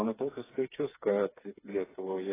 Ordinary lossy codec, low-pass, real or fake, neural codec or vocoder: AAC, 16 kbps; 3.6 kHz; fake; codec, 16 kHz, 4 kbps, FreqCodec, smaller model